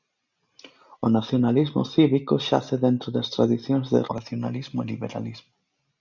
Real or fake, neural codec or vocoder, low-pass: real; none; 7.2 kHz